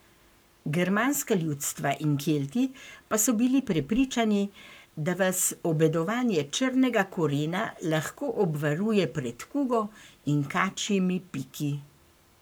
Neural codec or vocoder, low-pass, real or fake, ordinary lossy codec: codec, 44.1 kHz, 7.8 kbps, Pupu-Codec; none; fake; none